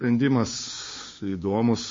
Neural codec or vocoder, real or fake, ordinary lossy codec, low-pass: codec, 16 kHz, 6 kbps, DAC; fake; MP3, 32 kbps; 7.2 kHz